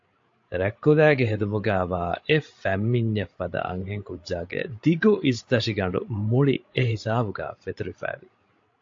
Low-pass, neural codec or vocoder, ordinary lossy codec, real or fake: 7.2 kHz; codec, 16 kHz, 16 kbps, FreqCodec, larger model; AAC, 48 kbps; fake